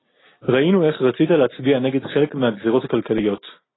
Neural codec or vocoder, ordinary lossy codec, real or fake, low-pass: none; AAC, 16 kbps; real; 7.2 kHz